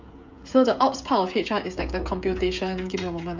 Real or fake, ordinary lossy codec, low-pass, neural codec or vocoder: fake; MP3, 64 kbps; 7.2 kHz; codec, 16 kHz, 16 kbps, FreqCodec, smaller model